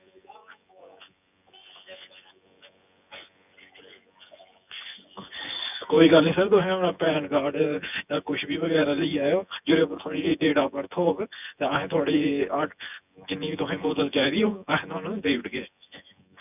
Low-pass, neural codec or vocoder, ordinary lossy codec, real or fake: 3.6 kHz; vocoder, 24 kHz, 100 mel bands, Vocos; none; fake